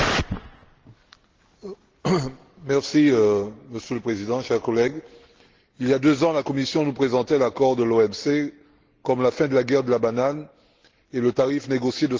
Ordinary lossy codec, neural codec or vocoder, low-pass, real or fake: Opus, 16 kbps; none; 7.2 kHz; real